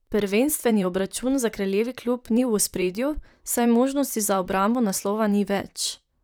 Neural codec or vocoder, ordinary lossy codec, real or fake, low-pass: vocoder, 44.1 kHz, 128 mel bands, Pupu-Vocoder; none; fake; none